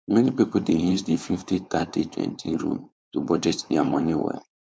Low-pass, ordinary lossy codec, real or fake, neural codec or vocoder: none; none; fake; codec, 16 kHz, 4.8 kbps, FACodec